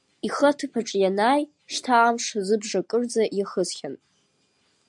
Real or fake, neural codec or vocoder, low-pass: real; none; 10.8 kHz